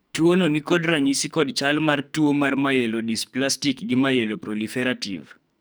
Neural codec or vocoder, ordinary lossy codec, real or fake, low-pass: codec, 44.1 kHz, 2.6 kbps, SNAC; none; fake; none